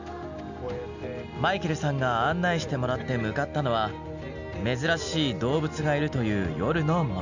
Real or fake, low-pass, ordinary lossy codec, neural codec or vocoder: real; 7.2 kHz; none; none